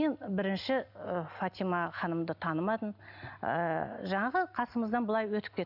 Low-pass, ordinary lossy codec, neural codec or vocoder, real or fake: 5.4 kHz; none; none; real